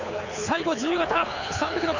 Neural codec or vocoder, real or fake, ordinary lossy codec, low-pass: codec, 24 kHz, 6 kbps, HILCodec; fake; none; 7.2 kHz